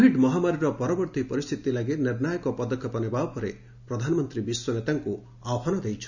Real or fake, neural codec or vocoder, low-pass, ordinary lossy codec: real; none; 7.2 kHz; none